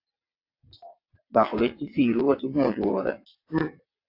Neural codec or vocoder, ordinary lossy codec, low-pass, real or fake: vocoder, 22.05 kHz, 80 mel bands, WaveNeXt; AAC, 32 kbps; 5.4 kHz; fake